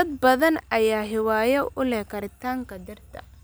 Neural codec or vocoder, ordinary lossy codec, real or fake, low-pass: none; none; real; none